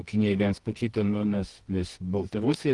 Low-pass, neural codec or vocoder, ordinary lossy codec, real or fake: 10.8 kHz; codec, 24 kHz, 0.9 kbps, WavTokenizer, medium music audio release; Opus, 24 kbps; fake